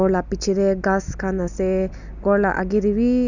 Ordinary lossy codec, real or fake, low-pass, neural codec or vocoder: none; real; 7.2 kHz; none